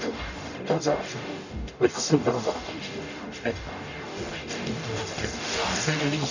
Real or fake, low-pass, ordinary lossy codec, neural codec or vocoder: fake; 7.2 kHz; none; codec, 44.1 kHz, 0.9 kbps, DAC